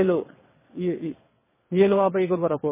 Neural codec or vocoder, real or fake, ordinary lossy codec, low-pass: vocoder, 22.05 kHz, 80 mel bands, WaveNeXt; fake; MP3, 16 kbps; 3.6 kHz